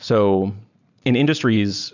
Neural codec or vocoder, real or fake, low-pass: none; real; 7.2 kHz